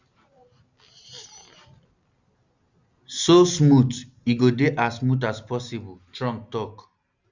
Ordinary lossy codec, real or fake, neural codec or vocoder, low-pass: Opus, 64 kbps; real; none; 7.2 kHz